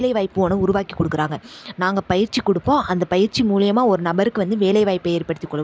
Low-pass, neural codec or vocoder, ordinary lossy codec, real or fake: none; none; none; real